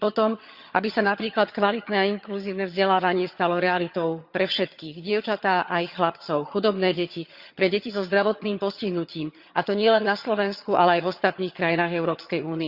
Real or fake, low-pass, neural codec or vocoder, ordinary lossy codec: fake; 5.4 kHz; vocoder, 22.05 kHz, 80 mel bands, HiFi-GAN; Opus, 64 kbps